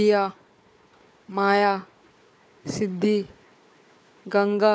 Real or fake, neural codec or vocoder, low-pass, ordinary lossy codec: fake; codec, 16 kHz, 4 kbps, FunCodec, trained on Chinese and English, 50 frames a second; none; none